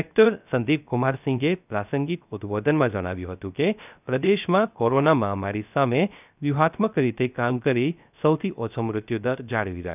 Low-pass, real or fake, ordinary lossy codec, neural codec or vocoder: 3.6 kHz; fake; none; codec, 16 kHz, 0.3 kbps, FocalCodec